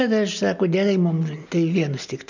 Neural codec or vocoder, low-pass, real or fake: none; 7.2 kHz; real